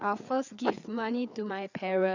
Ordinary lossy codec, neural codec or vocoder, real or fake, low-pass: none; codec, 16 kHz, 4 kbps, FreqCodec, larger model; fake; 7.2 kHz